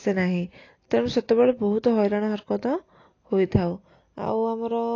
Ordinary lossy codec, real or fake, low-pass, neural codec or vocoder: AAC, 32 kbps; real; 7.2 kHz; none